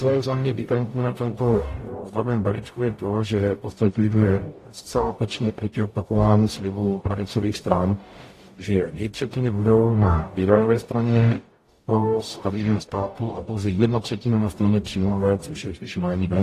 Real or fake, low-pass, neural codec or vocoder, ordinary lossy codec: fake; 14.4 kHz; codec, 44.1 kHz, 0.9 kbps, DAC; AAC, 48 kbps